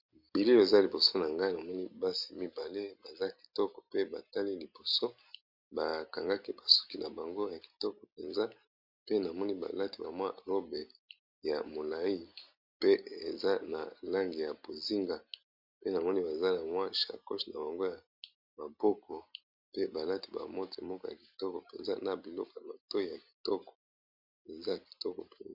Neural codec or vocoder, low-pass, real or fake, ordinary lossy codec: none; 5.4 kHz; real; MP3, 48 kbps